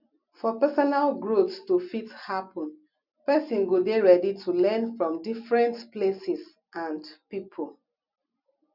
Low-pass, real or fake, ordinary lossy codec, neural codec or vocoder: 5.4 kHz; real; none; none